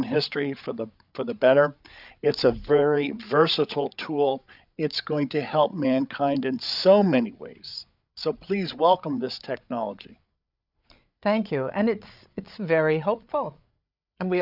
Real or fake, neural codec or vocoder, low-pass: fake; codec, 16 kHz, 8 kbps, FreqCodec, larger model; 5.4 kHz